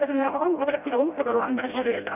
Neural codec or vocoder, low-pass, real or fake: codec, 16 kHz, 0.5 kbps, FreqCodec, smaller model; 3.6 kHz; fake